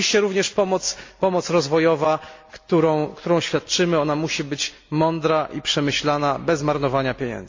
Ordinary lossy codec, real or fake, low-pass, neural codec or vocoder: MP3, 48 kbps; real; 7.2 kHz; none